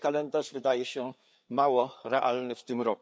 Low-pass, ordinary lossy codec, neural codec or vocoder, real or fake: none; none; codec, 16 kHz, 4 kbps, FreqCodec, larger model; fake